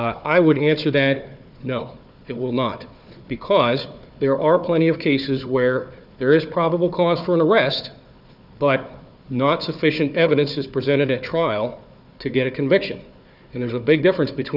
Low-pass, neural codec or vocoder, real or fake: 5.4 kHz; codec, 16 kHz, 4 kbps, FunCodec, trained on Chinese and English, 50 frames a second; fake